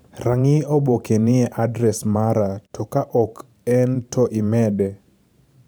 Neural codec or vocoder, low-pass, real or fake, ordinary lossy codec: vocoder, 44.1 kHz, 128 mel bands every 512 samples, BigVGAN v2; none; fake; none